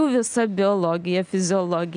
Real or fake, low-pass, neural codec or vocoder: real; 9.9 kHz; none